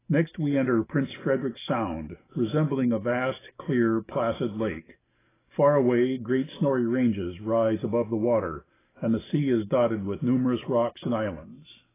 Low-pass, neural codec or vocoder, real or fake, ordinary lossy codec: 3.6 kHz; none; real; AAC, 16 kbps